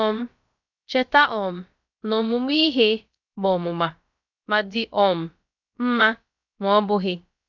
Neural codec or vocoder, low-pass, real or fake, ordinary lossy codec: codec, 16 kHz, about 1 kbps, DyCAST, with the encoder's durations; 7.2 kHz; fake; none